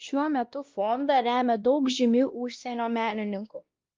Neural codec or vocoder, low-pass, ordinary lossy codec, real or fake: codec, 16 kHz, 1 kbps, X-Codec, WavLM features, trained on Multilingual LibriSpeech; 7.2 kHz; Opus, 32 kbps; fake